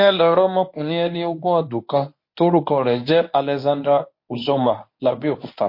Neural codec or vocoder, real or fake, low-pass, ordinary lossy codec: codec, 24 kHz, 0.9 kbps, WavTokenizer, medium speech release version 1; fake; 5.4 kHz; MP3, 32 kbps